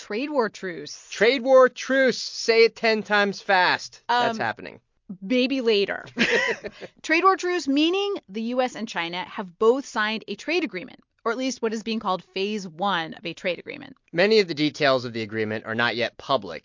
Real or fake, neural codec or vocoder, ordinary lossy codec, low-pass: real; none; MP3, 48 kbps; 7.2 kHz